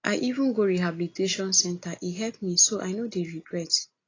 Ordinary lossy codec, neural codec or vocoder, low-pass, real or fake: AAC, 32 kbps; none; 7.2 kHz; real